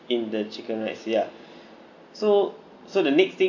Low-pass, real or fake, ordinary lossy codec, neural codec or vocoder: 7.2 kHz; real; none; none